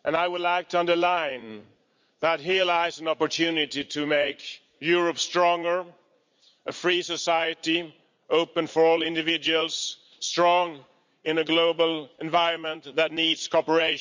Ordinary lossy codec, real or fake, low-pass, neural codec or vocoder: MP3, 64 kbps; fake; 7.2 kHz; vocoder, 44.1 kHz, 80 mel bands, Vocos